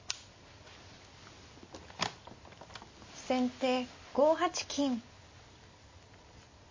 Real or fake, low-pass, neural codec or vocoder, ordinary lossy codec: real; 7.2 kHz; none; MP3, 32 kbps